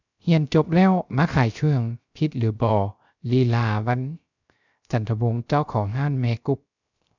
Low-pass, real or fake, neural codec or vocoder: 7.2 kHz; fake; codec, 16 kHz, 0.3 kbps, FocalCodec